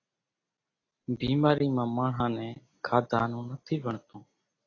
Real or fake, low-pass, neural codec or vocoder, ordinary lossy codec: real; 7.2 kHz; none; AAC, 32 kbps